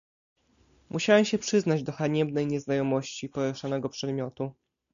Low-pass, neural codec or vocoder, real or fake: 7.2 kHz; none; real